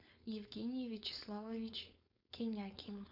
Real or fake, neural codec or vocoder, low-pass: fake; codec, 16 kHz, 4.8 kbps, FACodec; 5.4 kHz